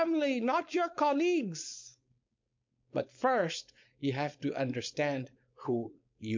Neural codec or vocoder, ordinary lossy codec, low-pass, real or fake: codec, 16 kHz, 4.8 kbps, FACodec; MP3, 48 kbps; 7.2 kHz; fake